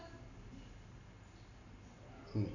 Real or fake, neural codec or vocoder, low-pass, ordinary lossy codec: real; none; 7.2 kHz; none